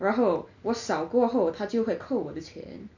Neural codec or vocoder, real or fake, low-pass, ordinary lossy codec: none; real; 7.2 kHz; none